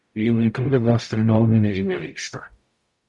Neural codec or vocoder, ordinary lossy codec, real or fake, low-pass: codec, 44.1 kHz, 0.9 kbps, DAC; Opus, 64 kbps; fake; 10.8 kHz